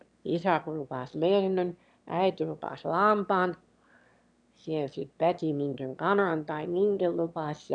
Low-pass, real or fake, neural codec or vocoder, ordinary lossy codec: 9.9 kHz; fake; autoencoder, 22.05 kHz, a latent of 192 numbers a frame, VITS, trained on one speaker; none